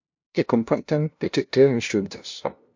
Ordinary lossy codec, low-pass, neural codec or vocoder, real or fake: MP3, 48 kbps; 7.2 kHz; codec, 16 kHz, 0.5 kbps, FunCodec, trained on LibriTTS, 25 frames a second; fake